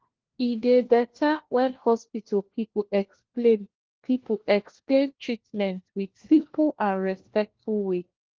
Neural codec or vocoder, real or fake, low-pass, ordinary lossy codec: codec, 16 kHz, 1 kbps, FunCodec, trained on LibriTTS, 50 frames a second; fake; 7.2 kHz; Opus, 16 kbps